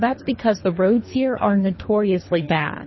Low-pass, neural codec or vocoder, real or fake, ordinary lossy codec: 7.2 kHz; codec, 24 kHz, 3 kbps, HILCodec; fake; MP3, 24 kbps